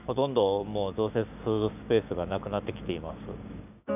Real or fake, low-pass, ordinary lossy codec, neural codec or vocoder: fake; 3.6 kHz; none; codec, 16 kHz, 6 kbps, DAC